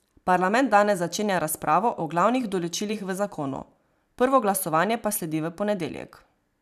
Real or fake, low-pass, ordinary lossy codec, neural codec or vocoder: fake; 14.4 kHz; none; vocoder, 44.1 kHz, 128 mel bands every 512 samples, BigVGAN v2